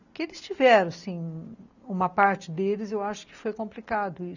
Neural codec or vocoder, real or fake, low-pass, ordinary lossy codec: none; real; 7.2 kHz; none